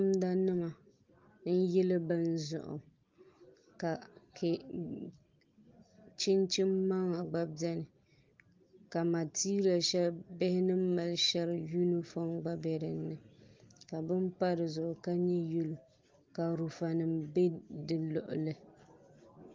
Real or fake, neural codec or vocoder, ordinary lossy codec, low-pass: real; none; Opus, 32 kbps; 7.2 kHz